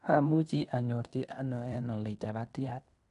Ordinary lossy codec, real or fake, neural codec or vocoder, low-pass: none; fake; codec, 16 kHz in and 24 kHz out, 0.9 kbps, LongCat-Audio-Codec, fine tuned four codebook decoder; 10.8 kHz